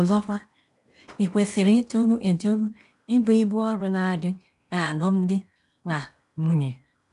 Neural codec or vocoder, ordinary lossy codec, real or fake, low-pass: codec, 16 kHz in and 24 kHz out, 0.8 kbps, FocalCodec, streaming, 65536 codes; none; fake; 10.8 kHz